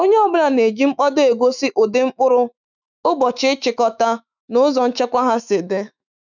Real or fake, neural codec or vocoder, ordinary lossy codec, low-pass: fake; autoencoder, 48 kHz, 128 numbers a frame, DAC-VAE, trained on Japanese speech; none; 7.2 kHz